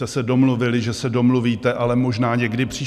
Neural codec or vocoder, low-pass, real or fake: none; 14.4 kHz; real